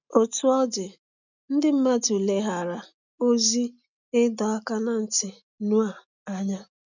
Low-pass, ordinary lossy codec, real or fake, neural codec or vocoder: 7.2 kHz; none; real; none